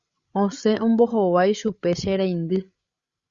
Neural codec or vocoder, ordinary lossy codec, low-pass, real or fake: codec, 16 kHz, 16 kbps, FreqCodec, larger model; Opus, 64 kbps; 7.2 kHz; fake